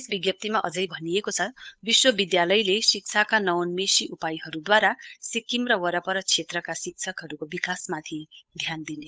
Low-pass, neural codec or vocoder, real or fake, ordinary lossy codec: none; codec, 16 kHz, 8 kbps, FunCodec, trained on Chinese and English, 25 frames a second; fake; none